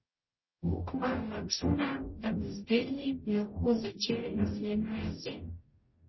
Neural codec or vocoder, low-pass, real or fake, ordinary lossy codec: codec, 44.1 kHz, 0.9 kbps, DAC; 7.2 kHz; fake; MP3, 24 kbps